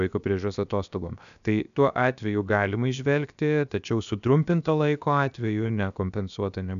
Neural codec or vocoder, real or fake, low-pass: codec, 16 kHz, 0.7 kbps, FocalCodec; fake; 7.2 kHz